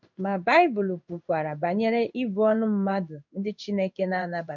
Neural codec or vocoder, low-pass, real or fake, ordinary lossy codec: codec, 16 kHz in and 24 kHz out, 1 kbps, XY-Tokenizer; 7.2 kHz; fake; none